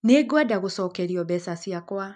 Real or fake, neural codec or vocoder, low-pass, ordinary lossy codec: real; none; 9.9 kHz; none